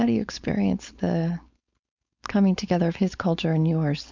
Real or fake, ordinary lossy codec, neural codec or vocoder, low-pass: fake; MP3, 64 kbps; codec, 16 kHz, 4.8 kbps, FACodec; 7.2 kHz